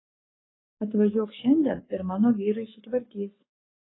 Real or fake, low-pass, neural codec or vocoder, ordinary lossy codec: fake; 7.2 kHz; codec, 44.1 kHz, 7.8 kbps, Pupu-Codec; AAC, 16 kbps